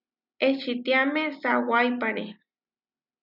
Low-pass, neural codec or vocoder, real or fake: 5.4 kHz; none; real